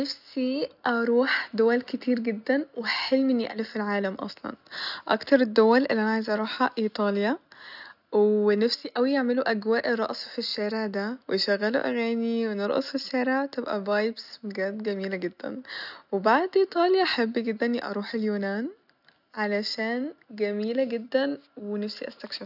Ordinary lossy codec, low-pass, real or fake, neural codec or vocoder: none; 5.4 kHz; real; none